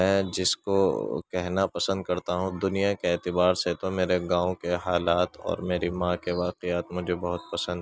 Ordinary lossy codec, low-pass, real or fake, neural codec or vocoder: none; none; real; none